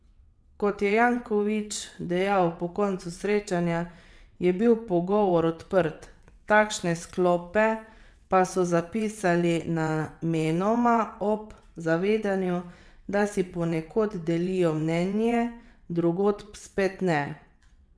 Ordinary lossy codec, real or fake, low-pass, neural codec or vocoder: none; fake; none; vocoder, 22.05 kHz, 80 mel bands, WaveNeXt